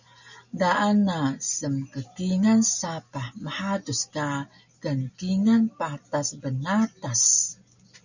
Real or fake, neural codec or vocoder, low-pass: real; none; 7.2 kHz